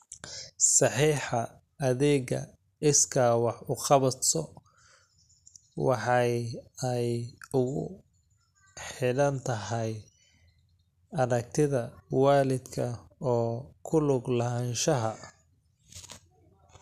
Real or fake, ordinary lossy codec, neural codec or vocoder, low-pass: real; none; none; 14.4 kHz